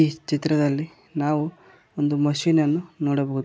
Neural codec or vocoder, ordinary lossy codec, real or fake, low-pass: none; none; real; none